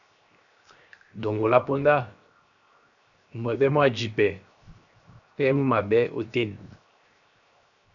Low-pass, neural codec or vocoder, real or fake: 7.2 kHz; codec, 16 kHz, 0.7 kbps, FocalCodec; fake